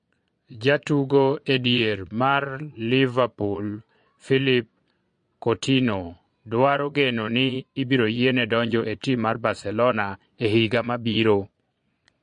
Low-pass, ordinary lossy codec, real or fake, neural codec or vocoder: 9.9 kHz; MP3, 48 kbps; fake; vocoder, 22.05 kHz, 80 mel bands, Vocos